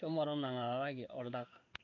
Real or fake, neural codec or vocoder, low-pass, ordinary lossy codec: fake; codec, 16 kHz in and 24 kHz out, 1 kbps, XY-Tokenizer; 7.2 kHz; none